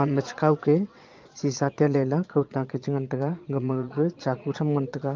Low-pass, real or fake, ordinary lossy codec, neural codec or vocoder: 7.2 kHz; fake; Opus, 24 kbps; vocoder, 22.05 kHz, 80 mel bands, Vocos